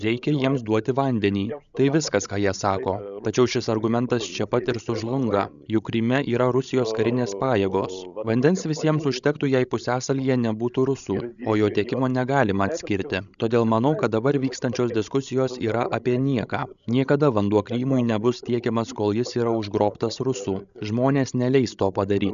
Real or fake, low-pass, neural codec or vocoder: fake; 7.2 kHz; codec, 16 kHz, 16 kbps, FreqCodec, larger model